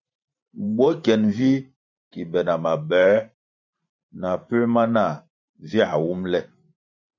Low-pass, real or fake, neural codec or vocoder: 7.2 kHz; fake; vocoder, 44.1 kHz, 128 mel bands every 512 samples, BigVGAN v2